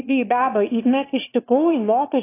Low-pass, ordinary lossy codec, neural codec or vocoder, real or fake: 3.6 kHz; AAC, 24 kbps; autoencoder, 22.05 kHz, a latent of 192 numbers a frame, VITS, trained on one speaker; fake